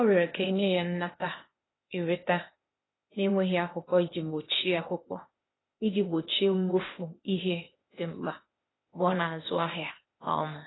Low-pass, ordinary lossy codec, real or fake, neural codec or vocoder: 7.2 kHz; AAC, 16 kbps; fake; codec, 16 kHz, 0.8 kbps, ZipCodec